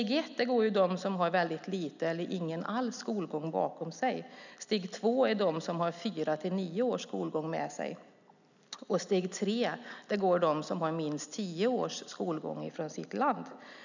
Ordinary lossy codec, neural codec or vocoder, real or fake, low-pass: none; none; real; 7.2 kHz